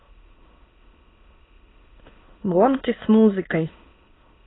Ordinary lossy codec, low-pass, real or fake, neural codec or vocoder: AAC, 16 kbps; 7.2 kHz; fake; autoencoder, 22.05 kHz, a latent of 192 numbers a frame, VITS, trained on many speakers